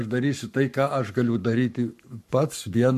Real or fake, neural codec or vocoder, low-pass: fake; codec, 44.1 kHz, 7.8 kbps, Pupu-Codec; 14.4 kHz